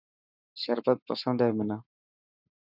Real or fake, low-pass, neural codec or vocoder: fake; 5.4 kHz; codec, 44.1 kHz, 7.8 kbps, Pupu-Codec